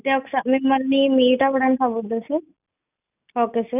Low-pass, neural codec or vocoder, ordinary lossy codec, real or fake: 3.6 kHz; none; none; real